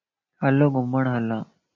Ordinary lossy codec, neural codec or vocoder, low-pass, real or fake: MP3, 32 kbps; none; 7.2 kHz; real